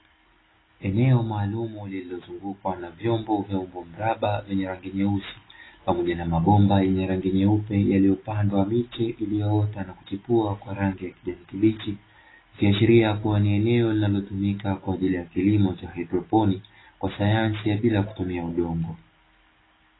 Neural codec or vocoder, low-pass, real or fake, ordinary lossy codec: none; 7.2 kHz; real; AAC, 16 kbps